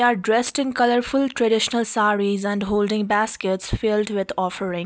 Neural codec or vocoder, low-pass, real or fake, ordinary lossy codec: none; none; real; none